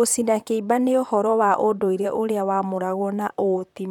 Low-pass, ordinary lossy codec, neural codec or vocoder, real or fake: 19.8 kHz; none; vocoder, 44.1 kHz, 128 mel bands, Pupu-Vocoder; fake